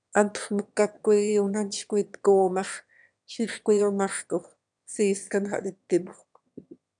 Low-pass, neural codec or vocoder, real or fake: 9.9 kHz; autoencoder, 22.05 kHz, a latent of 192 numbers a frame, VITS, trained on one speaker; fake